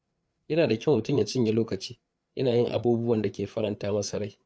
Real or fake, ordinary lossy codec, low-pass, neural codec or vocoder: fake; none; none; codec, 16 kHz, 4 kbps, FreqCodec, larger model